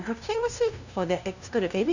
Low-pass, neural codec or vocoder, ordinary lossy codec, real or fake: 7.2 kHz; codec, 16 kHz, 0.5 kbps, FunCodec, trained on LibriTTS, 25 frames a second; none; fake